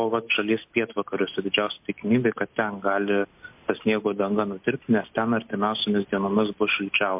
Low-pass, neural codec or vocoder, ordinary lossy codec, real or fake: 3.6 kHz; none; MP3, 32 kbps; real